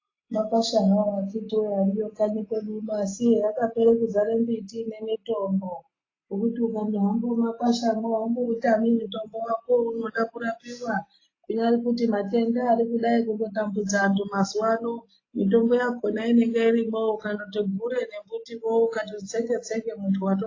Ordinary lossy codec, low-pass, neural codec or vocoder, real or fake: AAC, 32 kbps; 7.2 kHz; none; real